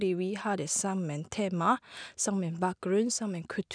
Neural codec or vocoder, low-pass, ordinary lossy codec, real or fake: none; 9.9 kHz; none; real